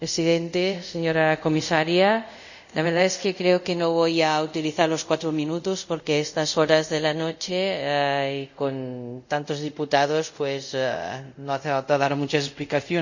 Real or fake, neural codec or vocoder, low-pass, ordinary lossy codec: fake; codec, 24 kHz, 0.5 kbps, DualCodec; 7.2 kHz; none